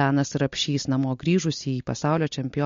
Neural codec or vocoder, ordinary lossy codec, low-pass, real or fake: none; MP3, 48 kbps; 7.2 kHz; real